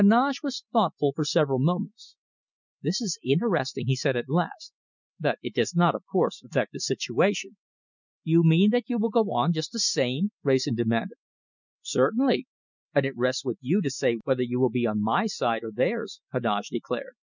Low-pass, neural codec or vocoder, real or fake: 7.2 kHz; none; real